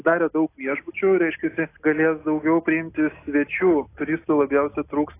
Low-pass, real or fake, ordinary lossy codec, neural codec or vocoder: 3.6 kHz; real; AAC, 24 kbps; none